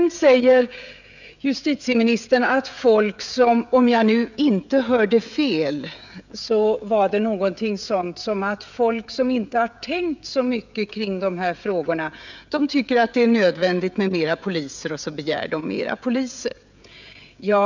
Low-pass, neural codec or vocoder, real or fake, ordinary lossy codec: 7.2 kHz; codec, 16 kHz, 16 kbps, FreqCodec, smaller model; fake; none